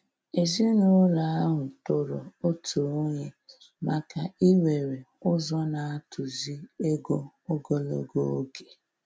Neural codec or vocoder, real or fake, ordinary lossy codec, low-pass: none; real; none; none